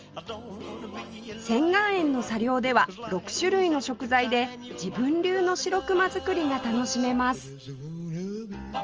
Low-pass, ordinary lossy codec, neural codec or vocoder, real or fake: 7.2 kHz; Opus, 24 kbps; none; real